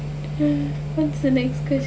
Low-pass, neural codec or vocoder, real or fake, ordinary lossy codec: none; none; real; none